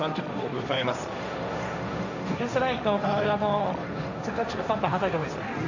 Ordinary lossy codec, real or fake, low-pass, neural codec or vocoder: none; fake; 7.2 kHz; codec, 16 kHz, 1.1 kbps, Voila-Tokenizer